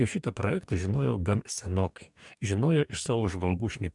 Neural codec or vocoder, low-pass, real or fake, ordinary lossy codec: codec, 44.1 kHz, 2.6 kbps, DAC; 10.8 kHz; fake; MP3, 96 kbps